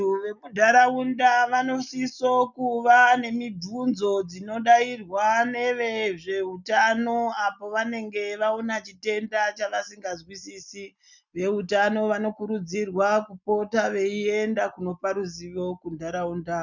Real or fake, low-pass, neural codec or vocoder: real; 7.2 kHz; none